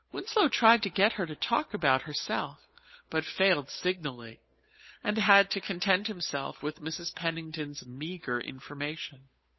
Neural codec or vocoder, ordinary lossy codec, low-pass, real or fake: codec, 16 kHz, 8 kbps, FunCodec, trained on Chinese and English, 25 frames a second; MP3, 24 kbps; 7.2 kHz; fake